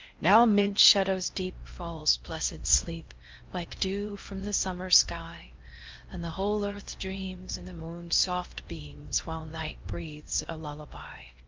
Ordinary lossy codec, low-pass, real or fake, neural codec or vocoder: Opus, 32 kbps; 7.2 kHz; fake; codec, 16 kHz in and 24 kHz out, 0.6 kbps, FocalCodec, streaming, 4096 codes